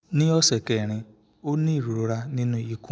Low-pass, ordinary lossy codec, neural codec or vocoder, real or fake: none; none; none; real